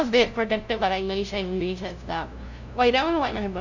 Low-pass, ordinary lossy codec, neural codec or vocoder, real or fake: 7.2 kHz; none; codec, 16 kHz, 0.5 kbps, FunCodec, trained on LibriTTS, 25 frames a second; fake